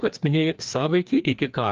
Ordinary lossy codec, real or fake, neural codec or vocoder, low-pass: Opus, 32 kbps; fake; codec, 16 kHz, 2 kbps, FreqCodec, larger model; 7.2 kHz